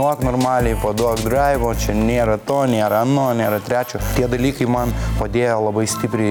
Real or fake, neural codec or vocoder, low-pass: real; none; 19.8 kHz